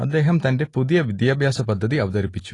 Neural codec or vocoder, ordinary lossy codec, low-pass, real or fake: none; AAC, 32 kbps; 10.8 kHz; real